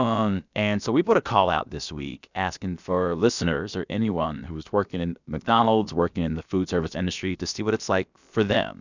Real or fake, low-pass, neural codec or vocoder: fake; 7.2 kHz; codec, 16 kHz, about 1 kbps, DyCAST, with the encoder's durations